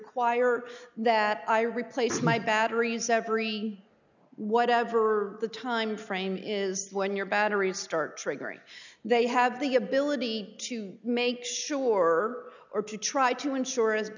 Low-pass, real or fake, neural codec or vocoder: 7.2 kHz; real; none